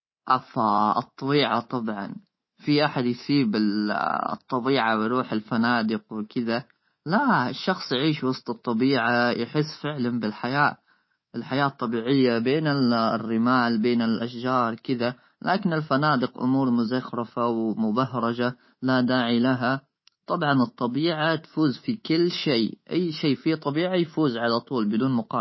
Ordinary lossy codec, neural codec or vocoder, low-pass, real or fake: MP3, 24 kbps; codec, 24 kHz, 3.1 kbps, DualCodec; 7.2 kHz; fake